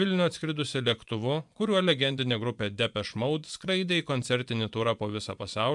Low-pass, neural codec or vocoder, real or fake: 10.8 kHz; vocoder, 24 kHz, 100 mel bands, Vocos; fake